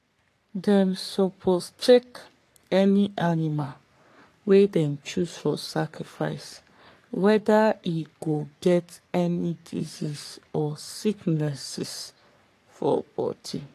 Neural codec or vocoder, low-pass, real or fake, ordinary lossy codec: codec, 44.1 kHz, 3.4 kbps, Pupu-Codec; 14.4 kHz; fake; AAC, 64 kbps